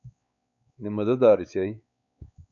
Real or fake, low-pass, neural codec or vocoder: fake; 7.2 kHz; codec, 16 kHz, 4 kbps, X-Codec, WavLM features, trained on Multilingual LibriSpeech